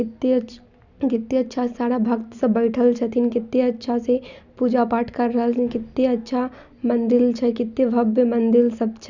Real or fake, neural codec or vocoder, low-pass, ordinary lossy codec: real; none; 7.2 kHz; none